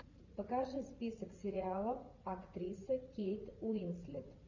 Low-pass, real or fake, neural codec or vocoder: 7.2 kHz; fake; vocoder, 44.1 kHz, 80 mel bands, Vocos